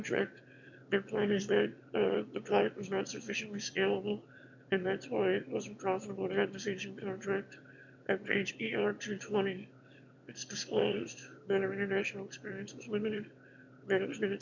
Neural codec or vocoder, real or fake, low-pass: autoencoder, 22.05 kHz, a latent of 192 numbers a frame, VITS, trained on one speaker; fake; 7.2 kHz